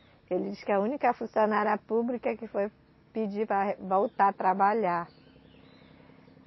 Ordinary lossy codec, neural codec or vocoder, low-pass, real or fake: MP3, 24 kbps; none; 7.2 kHz; real